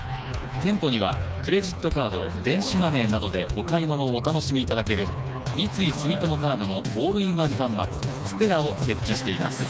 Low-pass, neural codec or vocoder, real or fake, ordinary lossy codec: none; codec, 16 kHz, 2 kbps, FreqCodec, smaller model; fake; none